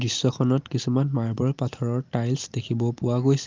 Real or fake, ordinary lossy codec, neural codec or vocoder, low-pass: real; Opus, 32 kbps; none; 7.2 kHz